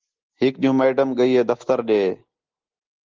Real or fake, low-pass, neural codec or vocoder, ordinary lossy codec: real; 7.2 kHz; none; Opus, 16 kbps